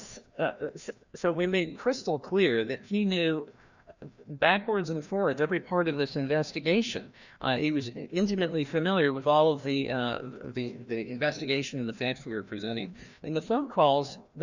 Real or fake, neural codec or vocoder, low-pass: fake; codec, 16 kHz, 1 kbps, FreqCodec, larger model; 7.2 kHz